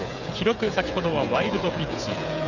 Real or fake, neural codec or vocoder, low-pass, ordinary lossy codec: fake; codec, 16 kHz, 16 kbps, FreqCodec, smaller model; 7.2 kHz; none